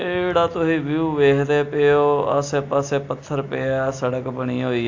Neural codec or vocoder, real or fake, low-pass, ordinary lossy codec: none; real; 7.2 kHz; AAC, 48 kbps